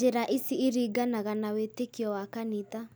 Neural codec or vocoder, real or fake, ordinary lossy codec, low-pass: none; real; none; none